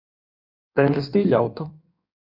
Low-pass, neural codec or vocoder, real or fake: 5.4 kHz; codec, 16 kHz in and 24 kHz out, 1.1 kbps, FireRedTTS-2 codec; fake